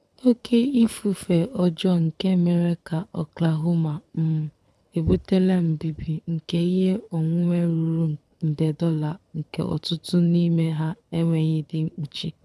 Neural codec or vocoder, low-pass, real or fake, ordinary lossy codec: codec, 24 kHz, 6 kbps, HILCodec; none; fake; none